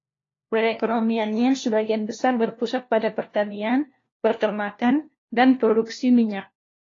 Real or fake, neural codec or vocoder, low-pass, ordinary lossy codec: fake; codec, 16 kHz, 1 kbps, FunCodec, trained on LibriTTS, 50 frames a second; 7.2 kHz; AAC, 32 kbps